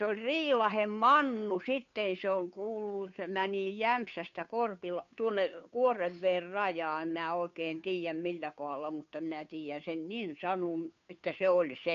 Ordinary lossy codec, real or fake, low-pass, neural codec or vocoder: MP3, 96 kbps; fake; 7.2 kHz; codec, 16 kHz, 2 kbps, FunCodec, trained on Chinese and English, 25 frames a second